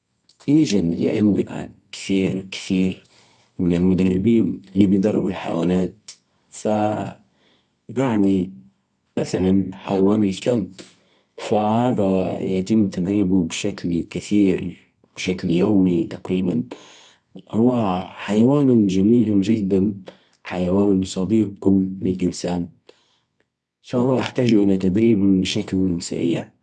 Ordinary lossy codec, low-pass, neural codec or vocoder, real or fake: none; none; codec, 24 kHz, 0.9 kbps, WavTokenizer, medium music audio release; fake